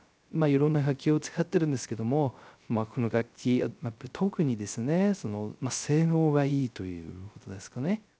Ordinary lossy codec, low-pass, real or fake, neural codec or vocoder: none; none; fake; codec, 16 kHz, 0.3 kbps, FocalCodec